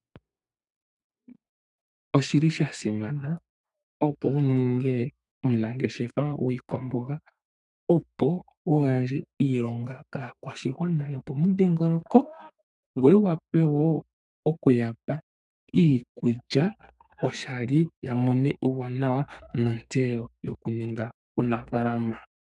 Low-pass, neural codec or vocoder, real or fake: 10.8 kHz; codec, 32 kHz, 1.9 kbps, SNAC; fake